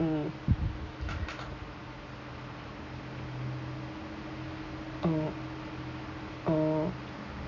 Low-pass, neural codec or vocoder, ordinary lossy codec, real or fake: 7.2 kHz; none; none; real